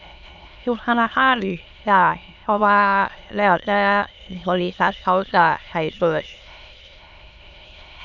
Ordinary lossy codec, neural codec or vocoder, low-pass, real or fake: none; autoencoder, 22.05 kHz, a latent of 192 numbers a frame, VITS, trained on many speakers; 7.2 kHz; fake